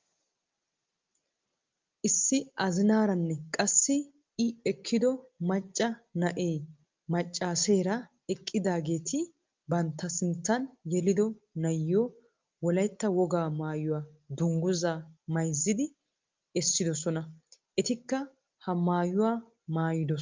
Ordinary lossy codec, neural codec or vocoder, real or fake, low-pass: Opus, 24 kbps; none; real; 7.2 kHz